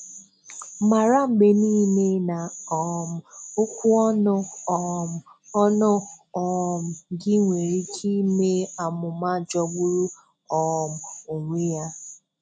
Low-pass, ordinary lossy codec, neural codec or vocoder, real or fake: 9.9 kHz; none; none; real